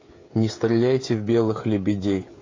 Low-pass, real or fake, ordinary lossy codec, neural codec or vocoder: 7.2 kHz; fake; AAC, 32 kbps; codec, 16 kHz, 16 kbps, FreqCodec, smaller model